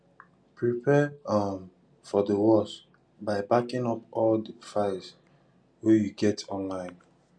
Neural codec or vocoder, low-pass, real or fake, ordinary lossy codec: none; 9.9 kHz; real; none